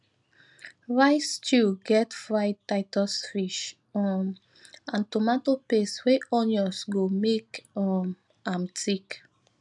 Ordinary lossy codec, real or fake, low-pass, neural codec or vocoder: none; real; 10.8 kHz; none